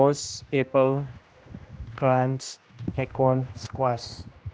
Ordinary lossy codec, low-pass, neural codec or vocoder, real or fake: none; none; codec, 16 kHz, 1 kbps, X-Codec, HuBERT features, trained on general audio; fake